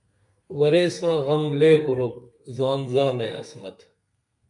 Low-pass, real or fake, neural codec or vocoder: 10.8 kHz; fake; codec, 32 kHz, 1.9 kbps, SNAC